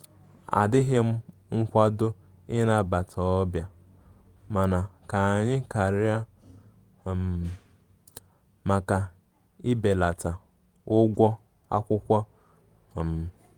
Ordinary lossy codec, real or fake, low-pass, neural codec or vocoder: Opus, 32 kbps; fake; 19.8 kHz; vocoder, 48 kHz, 128 mel bands, Vocos